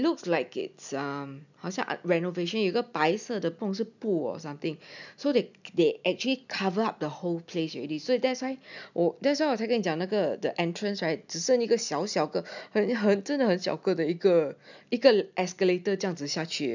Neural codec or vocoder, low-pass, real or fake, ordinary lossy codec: none; 7.2 kHz; real; none